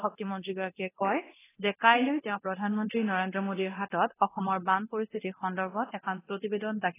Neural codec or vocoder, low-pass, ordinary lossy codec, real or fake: codec, 24 kHz, 0.9 kbps, DualCodec; 3.6 kHz; AAC, 16 kbps; fake